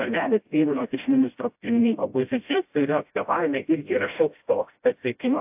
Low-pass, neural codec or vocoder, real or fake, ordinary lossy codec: 3.6 kHz; codec, 16 kHz, 0.5 kbps, FreqCodec, smaller model; fake; AAC, 32 kbps